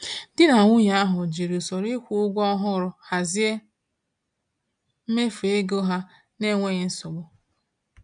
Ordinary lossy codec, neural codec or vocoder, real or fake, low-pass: none; none; real; 9.9 kHz